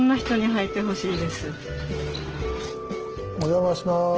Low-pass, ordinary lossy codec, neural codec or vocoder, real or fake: 7.2 kHz; Opus, 16 kbps; none; real